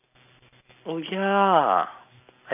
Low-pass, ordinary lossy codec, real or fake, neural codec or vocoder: 3.6 kHz; none; real; none